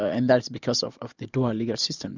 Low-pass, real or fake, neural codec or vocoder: 7.2 kHz; real; none